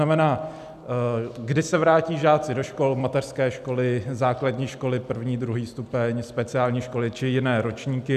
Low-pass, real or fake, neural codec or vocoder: 14.4 kHz; real; none